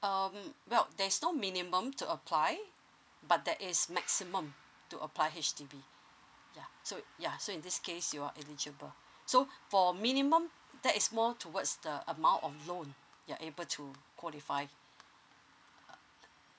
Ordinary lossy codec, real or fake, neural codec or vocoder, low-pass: none; real; none; none